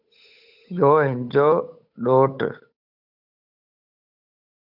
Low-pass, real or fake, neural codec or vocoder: 5.4 kHz; fake; codec, 16 kHz, 8 kbps, FunCodec, trained on Chinese and English, 25 frames a second